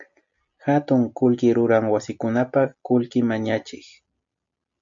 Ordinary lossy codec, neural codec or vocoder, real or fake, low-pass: MP3, 64 kbps; none; real; 7.2 kHz